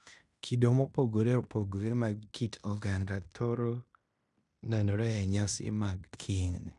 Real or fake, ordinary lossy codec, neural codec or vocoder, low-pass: fake; none; codec, 16 kHz in and 24 kHz out, 0.9 kbps, LongCat-Audio-Codec, fine tuned four codebook decoder; 10.8 kHz